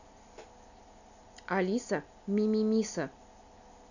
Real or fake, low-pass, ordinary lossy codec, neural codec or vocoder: real; 7.2 kHz; none; none